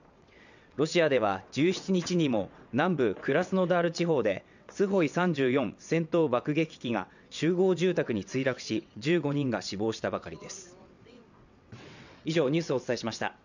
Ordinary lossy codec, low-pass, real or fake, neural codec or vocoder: none; 7.2 kHz; fake; vocoder, 22.05 kHz, 80 mel bands, WaveNeXt